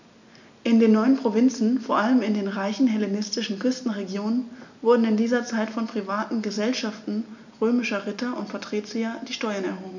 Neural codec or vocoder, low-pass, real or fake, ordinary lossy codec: none; 7.2 kHz; real; none